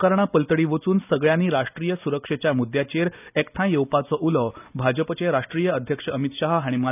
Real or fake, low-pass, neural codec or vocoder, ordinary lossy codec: real; 3.6 kHz; none; none